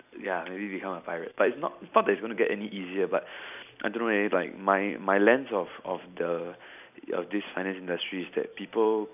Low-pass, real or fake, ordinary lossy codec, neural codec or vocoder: 3.6 kHz; real; none; none